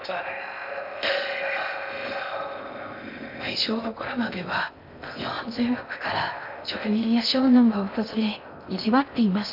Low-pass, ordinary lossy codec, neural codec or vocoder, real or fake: 5.4 kHz; none; codec, 16 kHz in and 24 kHz out, 0.6 kbps, FocalCodec, streaming, 2048 codes; fake